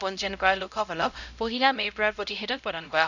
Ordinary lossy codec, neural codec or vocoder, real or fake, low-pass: none; codec, 16 kHz, 0.5 kbps, X-Codec, HuBERT features, trained on LibriSpeech; fake; 7.2 kHz